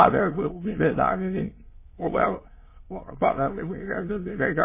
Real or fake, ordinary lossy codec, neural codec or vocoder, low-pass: fake; MP3, 16 kbps; autoencoder, 22.05 kHz, a latent of 192 numbers a frame, VITS, trained on many speakers; 3.6 kHz